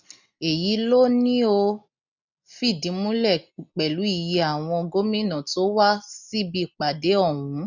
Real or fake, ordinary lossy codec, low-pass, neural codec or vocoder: real; none; 7.2 kHz; none